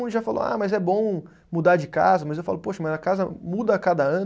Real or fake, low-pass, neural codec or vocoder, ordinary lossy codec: real; none; none; none